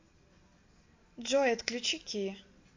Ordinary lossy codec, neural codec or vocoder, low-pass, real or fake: MP3, 48 kbps; none; 7.2 kHz; real